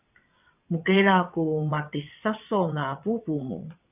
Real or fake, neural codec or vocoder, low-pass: fake; vocoder, 22.05 kHz, 80 mel bands, WaveNeXt; 3.6 kHz